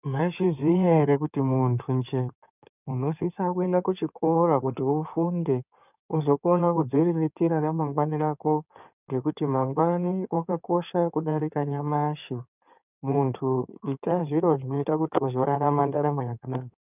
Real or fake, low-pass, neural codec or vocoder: fake; 3.6 kHz; codec, 16 kHz in and 24 kHz out, 2.2 kbps, FireRedTTS-2 codec